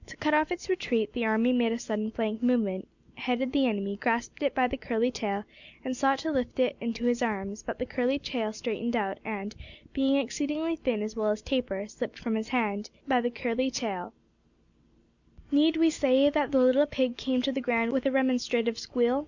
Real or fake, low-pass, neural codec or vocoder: real; 7.2 kHz; none